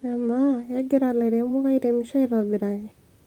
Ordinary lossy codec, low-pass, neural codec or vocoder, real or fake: Opus, 32 kbps; 19.8 kHz; vocoder, 44.1 kHz, 128 mel bands, Pupu-Vocoder; fake